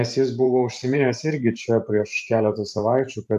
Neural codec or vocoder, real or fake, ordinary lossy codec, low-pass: vocoder, 44.1 kHz, 128 mel bands every 512 samples, BigVGAN v2; fake; AAC, 96 kbps; 14.4 kHz